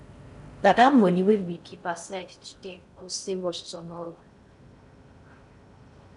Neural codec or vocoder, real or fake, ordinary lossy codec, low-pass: codec, 16 kHz in and 24 kHz out, 0.6 kbps, FocalCodec, streaming, 4096 codes; fake; none; 10.8 kHz